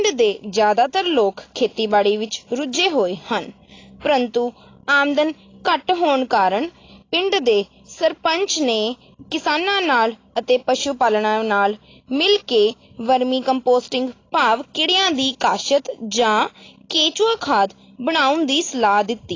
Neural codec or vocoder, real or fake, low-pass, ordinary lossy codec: none; real; 7.2 kHz; AAC, 32 kbps